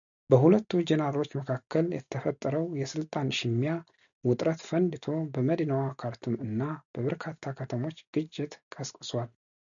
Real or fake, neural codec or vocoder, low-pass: real; none; 7.2 kHz